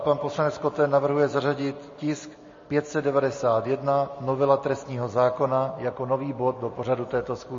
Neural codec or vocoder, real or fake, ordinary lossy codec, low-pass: none; real; MP3, 32 kbps; 7.2 kHz